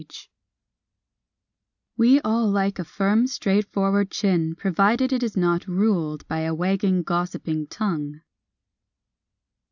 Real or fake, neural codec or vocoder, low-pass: real; none; 7.2 kHz